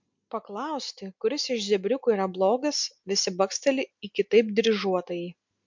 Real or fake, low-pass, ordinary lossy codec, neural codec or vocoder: real; 7.2 kHz; MP3, 64 kbps; none